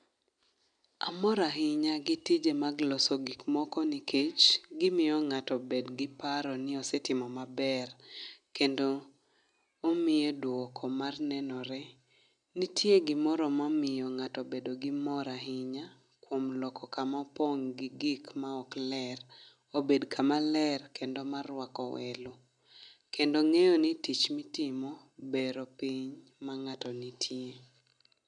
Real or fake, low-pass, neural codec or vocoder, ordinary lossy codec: real; 9.9 kHz; none; none